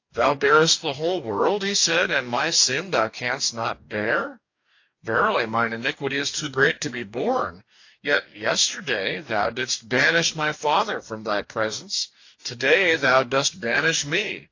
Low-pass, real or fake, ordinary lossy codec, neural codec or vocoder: 7.2 kHz; fake; AAC, 48 kbps; codec, 44.1 kHz, 2.6 kbps, DAC